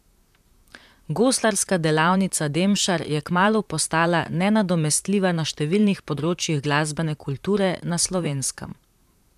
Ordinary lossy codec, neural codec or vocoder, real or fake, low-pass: none; vocoder, 44.1 kHz, 128 mel bands, Pupu-Vocoder; fake; 14.4 kHz